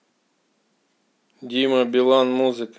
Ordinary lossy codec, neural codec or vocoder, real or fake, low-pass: none; none; real; none